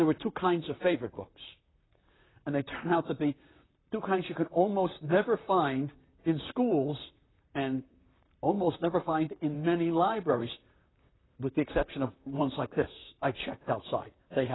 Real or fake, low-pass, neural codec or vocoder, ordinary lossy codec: fake; 7.2 kHz; vocoder, 44.1 kHz, 128 mel bands, Pupu-Vocoder; AAC, 16 kbps